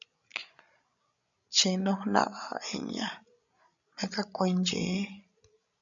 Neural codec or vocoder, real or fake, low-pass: codec, 16 kHz, 16 kbps, FreqCodec, larger model; fake; 7.2 kHz